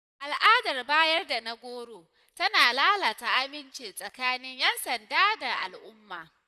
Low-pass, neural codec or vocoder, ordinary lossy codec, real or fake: 14.4 kHz; vocoder, 44.1 kHz, 128 mel bands, Pupu-Vocoder; none; fake